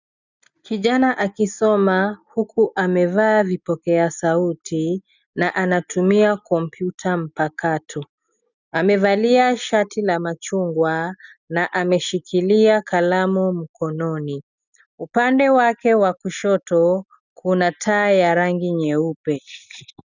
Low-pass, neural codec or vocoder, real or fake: 7.2 kHz; none; real